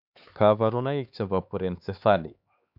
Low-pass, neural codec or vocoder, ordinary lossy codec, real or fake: 5.4 kHz; codec, 16 kHz, 4 kbps, X-Codec, HuBERT features, trained on LibriSpeech; none; fake